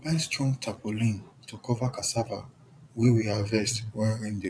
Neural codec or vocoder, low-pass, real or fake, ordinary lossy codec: vocoder, 22.05 kHz, 80 mel bands, Vocos; none; fake; none